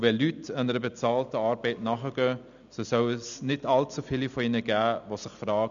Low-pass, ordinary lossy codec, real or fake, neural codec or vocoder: 7.2 kHz; none; real; none